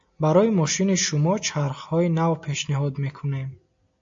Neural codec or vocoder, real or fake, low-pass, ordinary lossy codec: none; real; 7.2 kHz; AAC, 64 kbps